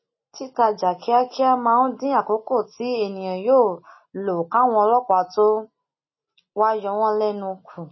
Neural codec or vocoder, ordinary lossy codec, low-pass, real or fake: none; MP3, 24 kbps; 7.2 kHz; real